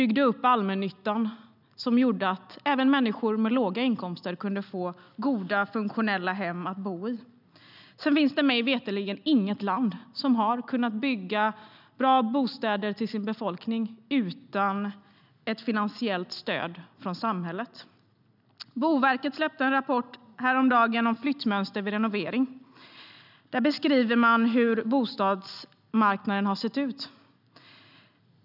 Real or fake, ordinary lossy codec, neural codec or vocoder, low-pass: real; none; none; 5.4 kHz